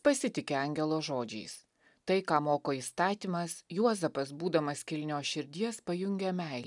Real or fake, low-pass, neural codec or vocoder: real; 10.8 kHz; none